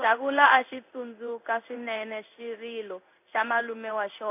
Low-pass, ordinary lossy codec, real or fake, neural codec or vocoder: 3.6 kHz; none; fake; codec, 16 kHz in and 24 kHz out, 1 kbps, XY-Tokenizer